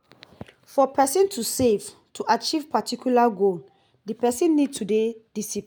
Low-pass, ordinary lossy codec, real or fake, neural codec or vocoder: none; none; real; none